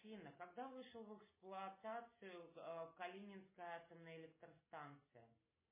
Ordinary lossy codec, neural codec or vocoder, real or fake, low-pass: MP3, 16 kbps; none; real; 3.6 kHz